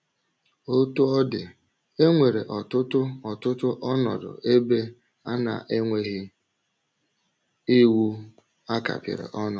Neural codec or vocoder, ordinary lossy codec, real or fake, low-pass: none; none; real; none